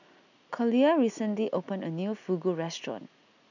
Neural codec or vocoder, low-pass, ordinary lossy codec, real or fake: none; 7.2 kHz; none; real